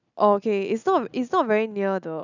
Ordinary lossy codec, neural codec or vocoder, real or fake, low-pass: none; none; real; 7.2 kHz